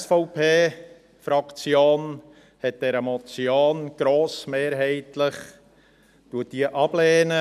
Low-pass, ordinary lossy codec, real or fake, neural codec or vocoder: 14.4 kHz; none; real; none